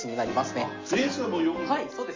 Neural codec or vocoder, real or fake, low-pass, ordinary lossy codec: none; real; 7.2 kHz; MP3, 48 kbps